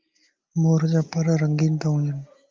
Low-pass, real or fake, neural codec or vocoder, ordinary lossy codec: 7.2 kHz; real; none; Opus, 32 kbps